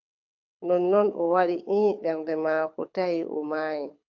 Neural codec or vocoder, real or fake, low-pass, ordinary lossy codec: codec, 24 kHz, 6 kbps, HILCodec; fake; 7.2 kHz; AAC, 48 kbps